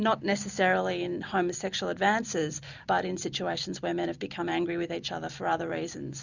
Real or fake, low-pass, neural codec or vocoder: real; 7.2 kHz; none